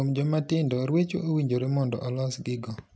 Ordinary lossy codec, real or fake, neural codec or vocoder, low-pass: none; real; none; none